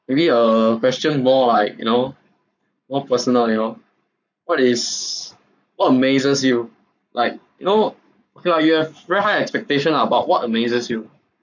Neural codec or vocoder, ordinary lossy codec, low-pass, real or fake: codec, 44.1 kHz, 7.8 kbps, Pupu-Codec; none; 7.2 kHz; fake